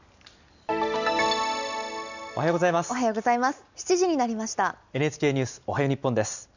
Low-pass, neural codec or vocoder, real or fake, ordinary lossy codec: 7.2 kHz; none; real; none